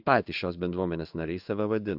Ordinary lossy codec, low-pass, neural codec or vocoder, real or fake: AAC, 48 kbps; 5.4 kHz; codec, 16 kHz in and 24 kHz out, 1 kbps, XY-Tokenizer; fake